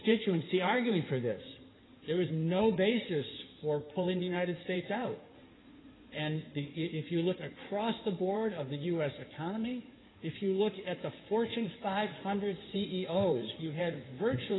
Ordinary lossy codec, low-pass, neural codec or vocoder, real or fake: AAC, 16 kbps; 7.2 kHz; codec, 16 kHz in and 24 kHz out, 2.2 kbps, FireRedTTS-2 codec; fake